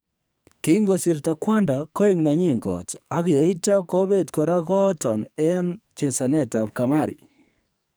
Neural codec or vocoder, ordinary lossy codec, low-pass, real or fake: codec, 44.1 kHz, 2.6 kbps, SNAC; none; none; fake